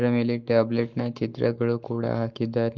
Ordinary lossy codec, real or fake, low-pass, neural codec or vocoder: Opus, 24 kbps; fake; 7.2 kHz; codec, 44.1 kHz, 7.8 kbps, Pupu-Codec